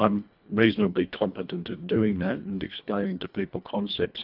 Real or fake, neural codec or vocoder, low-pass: fake; codec, 24 kHz, 1.5 kbps, HILCodec; 5.4 kHz